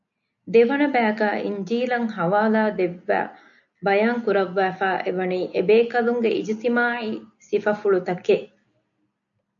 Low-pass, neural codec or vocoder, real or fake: 7.2 kHz; none; real